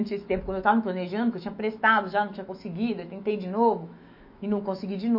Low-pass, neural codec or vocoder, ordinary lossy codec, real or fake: 5.4 kHz; autoencoder, 48 kHz, 128 numbers a frame, DAC-VAE, trained on Japanese speech; MP3, 32 kbps; fake